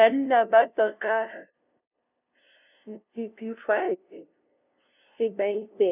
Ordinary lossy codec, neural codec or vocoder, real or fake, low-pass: none; codec, 16 kHz, 0.5 kbps, FunCodec, trained on LibriTTS, 25 frames a second; fake; 3.6 kHz